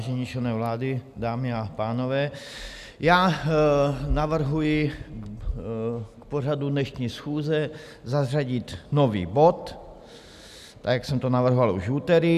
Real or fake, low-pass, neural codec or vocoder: real; 14.4 kHz; none